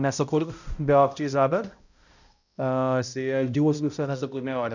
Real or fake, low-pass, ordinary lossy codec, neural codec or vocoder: fake; 7.2 kHz; none; codec, 16 kHz, 0.5 kbps, X-Codec, HuBERT features, trained on balanced general audio